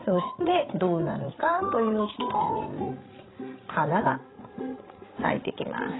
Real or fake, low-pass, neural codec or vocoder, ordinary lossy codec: fake; 7.2 kHz; codec, 16 kHz, 8 kbps, FreqCodec, larger model; AAC, 16 kbps